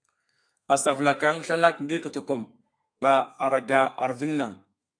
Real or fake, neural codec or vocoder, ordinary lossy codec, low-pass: fake; codec, 32 kHz, 1.9 kbps, SNAC; AAC, 64 kbps; 9.9 kHz